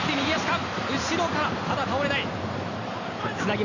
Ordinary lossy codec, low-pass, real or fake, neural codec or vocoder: none; 7.2 kHz; real; none